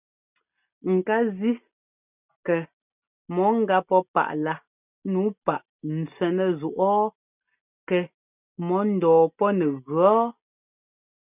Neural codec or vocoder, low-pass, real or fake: none; 3.6 kHz; real